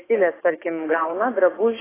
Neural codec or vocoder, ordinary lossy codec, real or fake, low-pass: codec, 44.1 kHz, 7.8 kbps, DAC; AAC, 16 kbps; fake; 3.6 kHz